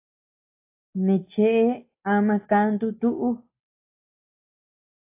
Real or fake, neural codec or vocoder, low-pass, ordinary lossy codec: real; none; 3.6 kHz; AAC, 24 kbps